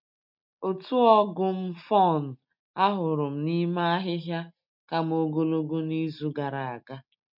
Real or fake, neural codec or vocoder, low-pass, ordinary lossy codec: real; none; 5.4 kHz; MP3, 48 kbps